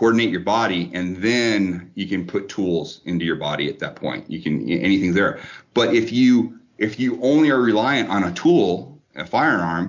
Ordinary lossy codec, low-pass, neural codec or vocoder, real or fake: MP3, 48 kbps; 7.2 kHz; none; real